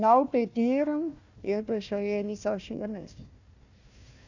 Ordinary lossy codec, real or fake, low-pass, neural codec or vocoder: none; fake; 7.2 kHz; codec, 16 kHz, 1 kbps, FunCodec, trained on Chinese and English, 50 frames a second